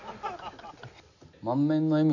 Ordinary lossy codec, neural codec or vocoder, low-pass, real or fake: none; none; 7.2 kHz; real